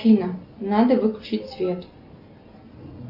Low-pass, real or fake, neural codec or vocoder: 5.4 kHz; real; none